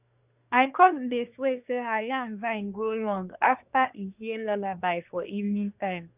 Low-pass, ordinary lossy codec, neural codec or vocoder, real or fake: 3.6 kHz; none; codec, 24 kHz, 1 kbps, SNAC; fake